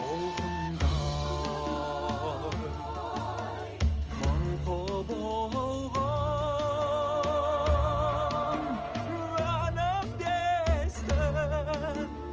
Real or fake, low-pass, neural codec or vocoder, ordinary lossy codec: real; 7.2 kHz; none; Opus, 24 kbps